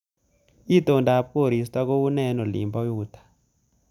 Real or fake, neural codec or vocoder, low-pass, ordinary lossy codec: real; none; 19.8 kHz; none